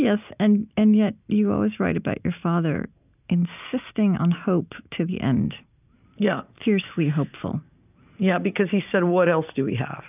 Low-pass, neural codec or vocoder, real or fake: 3.6 kHz; none; real